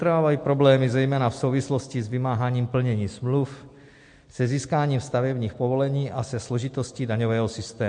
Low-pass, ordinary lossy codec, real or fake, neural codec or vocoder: 9.9 kHz; MP3, 48 kbps; real; none